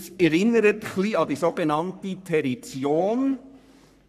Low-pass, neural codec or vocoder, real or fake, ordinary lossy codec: 14.4 kHz; codec, 44.1 kHz, 3.4 kbps, Pupu-Codec; fake; none